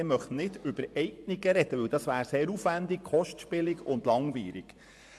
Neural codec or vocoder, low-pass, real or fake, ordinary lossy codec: vocoder, 24 kHz, 100 mel bands, Vocos; none; fake; none